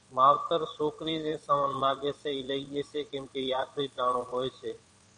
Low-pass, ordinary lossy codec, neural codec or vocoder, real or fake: 9.9 kHz; MP3, 96 kbps; vocoder, 22.05 kHz, 80 mel bands, Vocos; fake